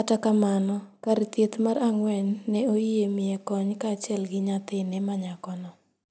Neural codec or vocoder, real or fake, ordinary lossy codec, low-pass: none; real; none; none